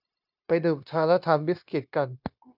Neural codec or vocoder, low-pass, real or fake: codec, 16 kHz, 0.9 kbps, LongCat-Audio-Codec; 5.4 kHz; fake